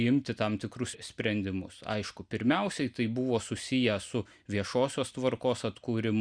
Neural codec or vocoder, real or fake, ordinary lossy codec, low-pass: none; real; Opus, 64 kbps; 9.9 kHz